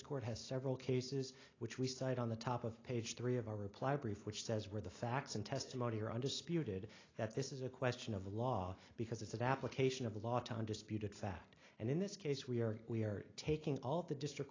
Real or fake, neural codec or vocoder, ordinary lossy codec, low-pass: real; none; AAC, 32 kbps; 7.2 kHz